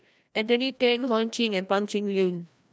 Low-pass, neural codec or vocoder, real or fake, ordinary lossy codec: none; codec, 16 kHz, 1 kbps, FreqCodec, larger model; fake; none